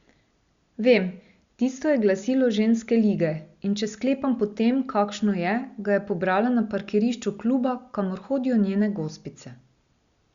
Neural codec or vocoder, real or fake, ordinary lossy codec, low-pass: none; real; Opus, 64 kbps; 7.2 kHz